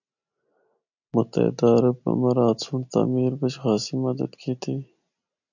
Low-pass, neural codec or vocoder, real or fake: 7.2 kHz; none; real